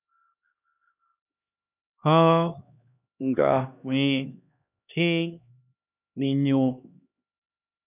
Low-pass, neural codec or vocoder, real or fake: 3.6 kHz; codec, 16 kHz, 1 kbps, X-Codec, HuBERT features, trained on LibriSpeech; fake